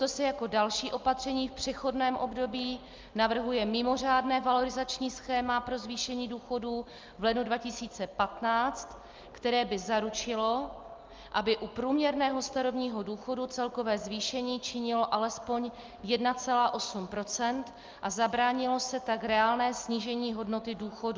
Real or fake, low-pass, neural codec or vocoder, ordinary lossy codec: real; 7.2 kHz; none; Opus, 32 kbps